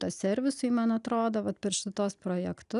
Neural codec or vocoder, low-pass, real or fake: none; 10.8 kHz; real